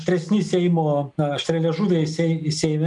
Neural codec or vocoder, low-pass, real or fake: none; 10.8 kHz; real